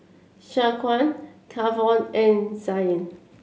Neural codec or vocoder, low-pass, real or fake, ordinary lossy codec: none; none; real; none